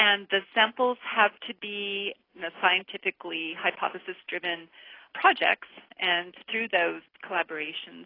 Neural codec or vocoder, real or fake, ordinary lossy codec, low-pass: none; real; AAC, 24 kbps; 5.4 kHz